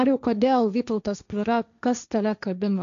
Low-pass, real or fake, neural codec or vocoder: 7.2 kHz; fake; codec, 16 kHz, 1.1 kbps, Voila-Tokenizer